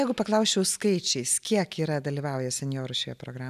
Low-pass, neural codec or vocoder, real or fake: 14.4 kHz; none; real